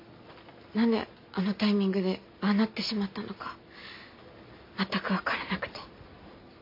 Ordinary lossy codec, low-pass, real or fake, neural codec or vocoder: MP3, 32 kbps; 5.4 kHz; real; none